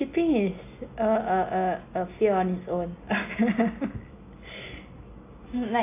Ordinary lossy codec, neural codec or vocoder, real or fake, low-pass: AAC, 24 kbps; none; real; 3.6 kHz